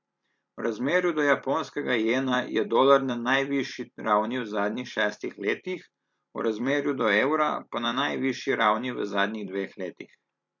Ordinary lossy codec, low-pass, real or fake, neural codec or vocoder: MP3, 48 kbps; 7.2 kHz; fake; vocoder, 44.1 kHz, 128 mel bands every 256 samples, BigVGAN v2